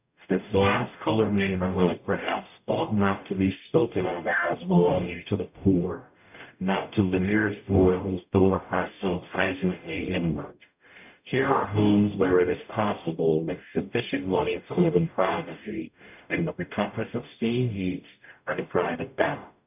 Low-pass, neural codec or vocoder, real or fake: 3.6 kHz; codec, 44.1 kHz, 0.9 kbps, DAC; fake